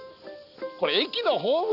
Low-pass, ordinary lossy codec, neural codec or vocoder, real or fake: 5.4 kHz; none; none; real